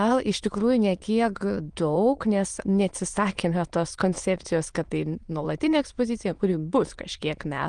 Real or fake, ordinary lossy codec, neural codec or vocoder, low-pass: fake; Opus, 24 kbps; autoencoder, 22.05 kHz, a latent of 192 numbers a frame, VITS, trained on many speakers; 9.9 kHz